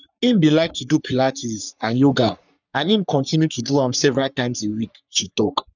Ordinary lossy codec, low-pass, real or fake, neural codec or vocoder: none; 7.2 kHz; fake; codec, 44.1 kHz, 3.4 kbps, Pupu-Codec